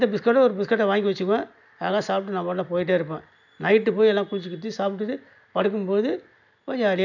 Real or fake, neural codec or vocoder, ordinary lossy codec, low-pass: real; none; none; 7.2 kHz